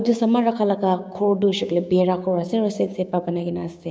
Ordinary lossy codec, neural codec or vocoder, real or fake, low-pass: none; codec, 16 kHz, 6 kbps, DAC; fake; none